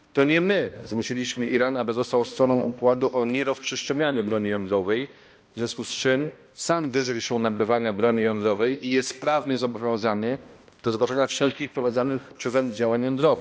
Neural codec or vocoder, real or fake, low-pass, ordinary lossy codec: codec, 16 kHz, 1 kbps, X-Codec, HuBERT features, trained on balanced general audio; fake; none; none